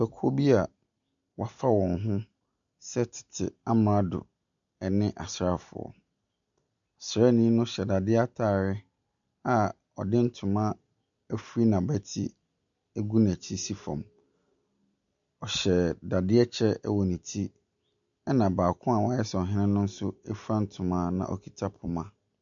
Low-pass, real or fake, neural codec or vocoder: 7.2 kHz; real; none